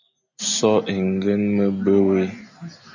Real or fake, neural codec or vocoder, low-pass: real; none; 7.2 kHz